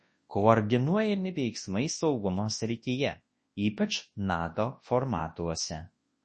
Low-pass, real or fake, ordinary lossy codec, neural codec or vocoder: 10.8 kHz; fake; MP3, 32 kbps; codec, 24 kHz, 0.9 kbps, WavTokenizer, large speech release